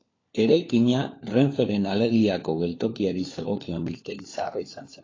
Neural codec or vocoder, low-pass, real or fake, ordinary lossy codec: codec, 16 kHz, 4 kbps, FunCodec, trained on LibriTTS, 50 frames a second; 7.2 kHz; fake; AAC, 32 kbps